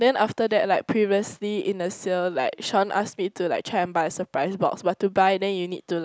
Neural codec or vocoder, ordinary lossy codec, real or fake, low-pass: none; none; real; none